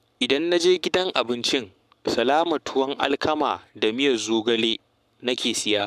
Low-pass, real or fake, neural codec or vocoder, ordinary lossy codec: 14.4 kHz; fake; codec, 44.1 kHz, 7.8 kbps, Pupu-Codec; none